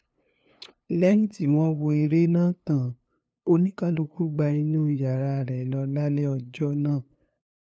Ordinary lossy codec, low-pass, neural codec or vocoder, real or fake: none; none; codec, 16 kHz, 2 kbps, FunCodec, trained on LibriTTS, 25 frames a second; fake